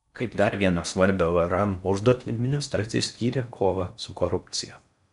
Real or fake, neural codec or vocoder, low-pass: fake; codec, 16 kHz in and 24 kHz out, 0.6 kbps, FocalCodec, streaming, 4096 codes; 10.8 kHz